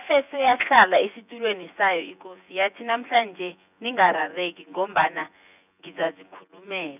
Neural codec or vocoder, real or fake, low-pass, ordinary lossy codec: vocoder, 24 kHz, 100 mel bands, Vocos; fake; 3.6 kHz; none